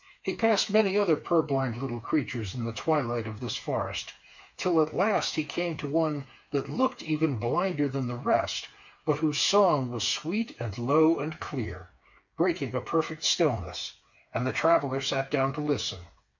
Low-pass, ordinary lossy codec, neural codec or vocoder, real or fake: 7.2 kHz; MP3, 48 kbps; codec, 16 kHz, 4 kbps, FreqCodec, smaller model; fake